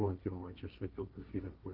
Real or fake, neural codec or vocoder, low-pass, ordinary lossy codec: fake; codec, 16 kHz, 1.1 kbps, Voila-Tokenizer; 5.4 kHz; Opus, 24 kbps